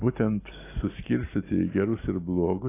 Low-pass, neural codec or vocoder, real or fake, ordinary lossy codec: 3.6 kHz; none; real; AAC, 24 kbps